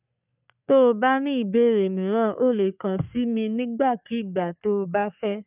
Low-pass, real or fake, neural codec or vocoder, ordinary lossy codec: 3.6 kHz; fake; codec, 44.1 kHz, 3.4 kbps, Pupu-Codec; none